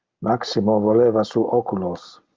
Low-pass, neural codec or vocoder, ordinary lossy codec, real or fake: 7.2 kHz; none; Opus, 24 kbps; real